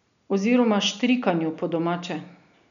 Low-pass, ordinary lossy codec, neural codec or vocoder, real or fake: 7.2 kHz; none; none; real